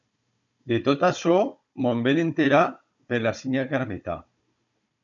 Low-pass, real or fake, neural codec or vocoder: 7.2 kHz; fake; codec, 16 kHz, 16 kbps, FunCodec, trained on Chinese and English, 50 frames a second